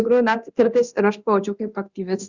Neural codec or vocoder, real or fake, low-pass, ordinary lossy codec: codec, 24 kHz, 0.9 kbps, DualCodec; fake; 7.2 kHz; Opus, 64 kbps